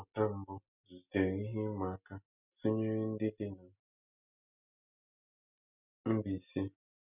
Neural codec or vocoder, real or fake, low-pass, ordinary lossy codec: none; real; 3.6 kHz; none